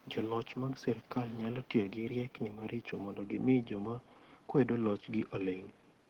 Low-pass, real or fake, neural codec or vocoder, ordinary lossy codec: 19.8 kHz; fake; codec, 44.1 kHz, 7.8 kbps, DAC; Opus, 16 kbps